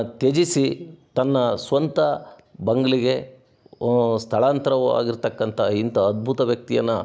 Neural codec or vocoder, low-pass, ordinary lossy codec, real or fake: none; none; none; real